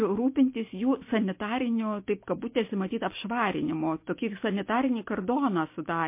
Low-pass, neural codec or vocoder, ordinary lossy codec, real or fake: 3.6 kHz; none; MP3, 24 kbps; real